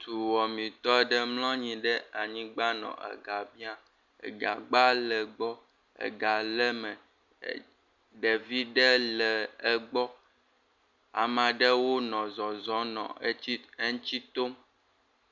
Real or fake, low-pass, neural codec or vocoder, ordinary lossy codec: real; 7.2 kHz; none; Opus, 64 kbps